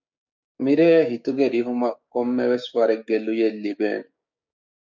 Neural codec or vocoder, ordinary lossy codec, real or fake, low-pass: codec, 16 kHz, 6 kbps, DAC; MP3, 64 kbps; fake; 7.2 kHz